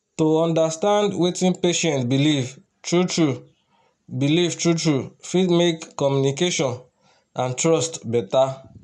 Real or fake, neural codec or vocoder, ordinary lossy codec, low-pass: real; none; none; none